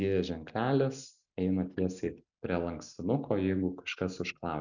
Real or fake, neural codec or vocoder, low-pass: real; none; 7.2 kHz